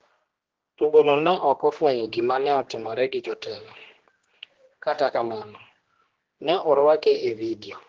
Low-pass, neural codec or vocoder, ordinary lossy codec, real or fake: 7.2 kHz; codec, 16 kHz, 1 kbps, X-Codec, HuBERT features, trained on general audio; Opus, 16 kbps; fake